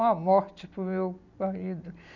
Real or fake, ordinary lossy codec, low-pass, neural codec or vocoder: real; none; 7.2 kHz; none